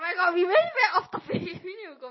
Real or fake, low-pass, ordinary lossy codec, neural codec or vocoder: real; 7.2 kHz; MP3, 24 kbps; none